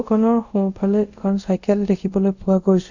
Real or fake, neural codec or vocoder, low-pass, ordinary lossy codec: fake; codec, 24 kHz, 0.5 kbps, DualCodec; 7.2 kHz; none